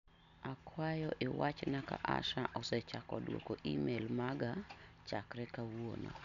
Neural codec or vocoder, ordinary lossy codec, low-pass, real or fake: none; none; 7.2 kHz; real